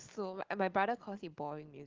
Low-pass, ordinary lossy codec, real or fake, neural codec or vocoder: 7.2 kHz; Opus, 16 kbps; real; none